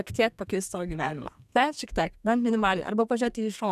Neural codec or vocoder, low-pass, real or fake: codec, 32 kHz, 1.9 kbps, SNAC; 14.4 kHz; fake